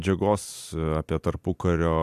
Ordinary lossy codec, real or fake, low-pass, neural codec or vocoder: AAC, 96 kbps; real; 14.4 kHz; none